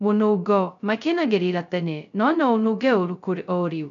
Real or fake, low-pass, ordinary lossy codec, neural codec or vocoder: fake; 7.2 kHz; none; codec, 16 kHz, 0.2 kbps, FocalCodec